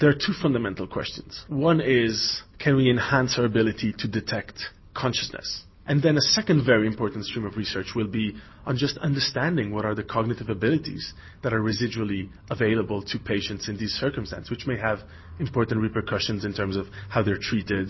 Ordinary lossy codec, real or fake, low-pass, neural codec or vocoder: MP3, 24 kbps; real; 7.2 kHz; none